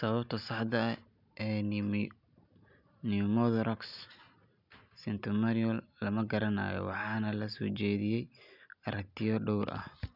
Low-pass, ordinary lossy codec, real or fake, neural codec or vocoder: 5.4 kHz; none; real; none